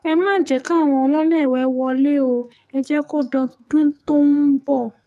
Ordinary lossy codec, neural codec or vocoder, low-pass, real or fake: none; codec, 44.1 kHz, 2.6 kbps, SNAC; 14.4 kHz; fake